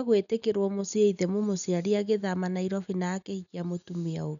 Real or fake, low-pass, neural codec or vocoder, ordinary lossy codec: real; 7.2 kHz; none; none